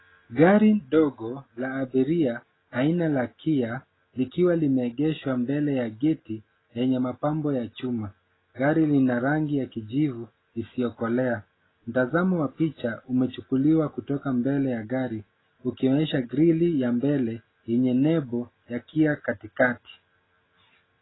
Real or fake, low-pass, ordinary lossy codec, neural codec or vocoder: real; 7.2 kHz; AAC, 16 kbps; none